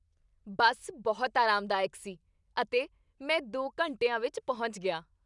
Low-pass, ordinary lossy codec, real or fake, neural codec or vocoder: 10.8 kHz; none; real; none